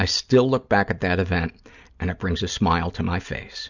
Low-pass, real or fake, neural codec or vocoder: 7.2 kHz; real; none